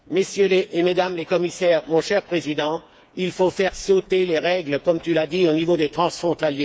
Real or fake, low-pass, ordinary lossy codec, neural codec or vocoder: fake; none; none; codec, 16 kHz, 4 kbps, FreqCodec, smaller model